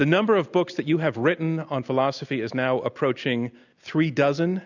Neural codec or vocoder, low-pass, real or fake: none; 7.2 kHz; real